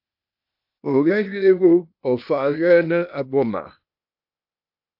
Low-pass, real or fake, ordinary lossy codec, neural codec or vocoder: 5.4 kHz; fake; AAC, 48 kbps; codec, 16 kHz, 0.8 kbps, ZipCodec